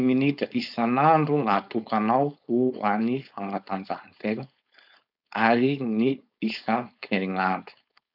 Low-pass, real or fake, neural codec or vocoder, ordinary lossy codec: 5.4 kHz; fake; codec, 16 kHz, 4.8 kbps, FACodec; none